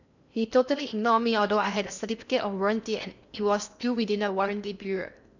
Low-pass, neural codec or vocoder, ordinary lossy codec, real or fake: 7.2 kHz; codec, 16 kHz in and 24 kHz out, 0.8 kbps, FocalCodec, streaming, 65536 codes; none; fake